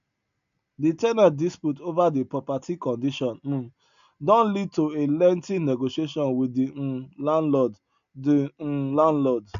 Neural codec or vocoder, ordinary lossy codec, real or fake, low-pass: none; none; real; 7.2 kHz